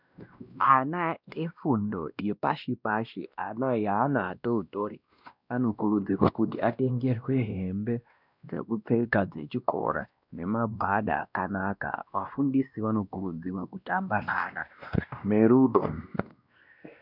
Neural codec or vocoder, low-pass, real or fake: codec, 16 kHz, 1 kbps, X-Codec, WavLM features, trained on Multilingual LibriSpeech; 5.4 kHz; fake